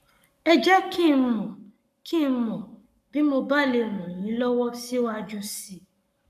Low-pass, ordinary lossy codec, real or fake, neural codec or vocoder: 14.4 kHz; none; fake; codec, 44.1 kHz, 7.8 kbps, Pupu-Codec